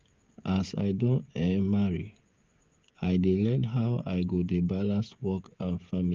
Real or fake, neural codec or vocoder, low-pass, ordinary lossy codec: fake; codec, 16 kHz, 16 kbps, FreqCodec, smaller model; 7.2 kHz; Opus, 24 kbps